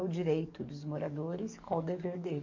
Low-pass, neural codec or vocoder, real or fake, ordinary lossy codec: 7.2 kHz; codec, 44.1 kHz, 7.8 kbps, DAC; fake; MP3, 64 kbps